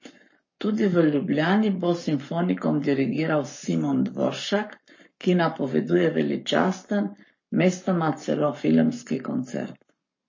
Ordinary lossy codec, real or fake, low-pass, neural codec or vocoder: MP3, 32 kbps; fake; 7.2 kHz; codec, 44.1 kHz, 7.8 kbps, Pupu-Codec